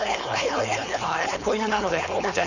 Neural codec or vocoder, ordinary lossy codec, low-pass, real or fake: codec, 16 kHz, 4.8 kbps, FACodec; none; 7.2 kHz; fake